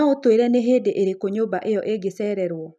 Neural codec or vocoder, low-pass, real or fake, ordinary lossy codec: none; none; real; none